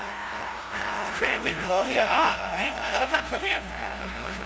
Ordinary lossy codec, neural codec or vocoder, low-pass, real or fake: none; codec, 16 kHz, 0.5 kbps, FunCodec, trained on LibriTTS, 25 frames a second; none; fake